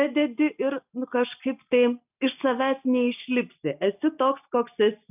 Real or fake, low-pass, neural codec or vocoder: real; 3.6 kHz; none